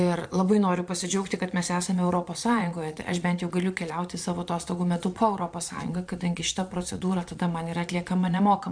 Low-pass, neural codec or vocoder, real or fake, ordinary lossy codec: 9.9 kHz; vocoder, 22.05 kHz, 80 mel bands, Vocos; fake; MP3, 64 kbps